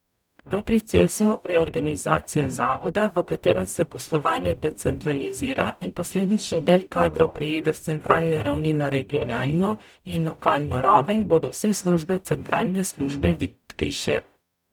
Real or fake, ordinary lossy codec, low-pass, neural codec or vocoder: fake; none; 19.8 kHz; codec, 44.1 kHz, 0.9 kbps, DAC